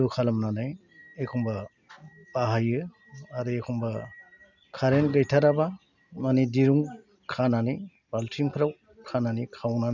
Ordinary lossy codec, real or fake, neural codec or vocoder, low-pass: none; real; none; 7.2 kHz